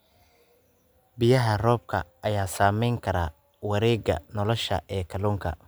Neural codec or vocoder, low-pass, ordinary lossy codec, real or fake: none; none; none; real